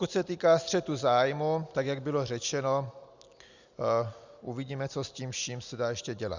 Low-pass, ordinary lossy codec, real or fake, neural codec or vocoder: 7.2 kHz; Opus, 64 kbps; real; none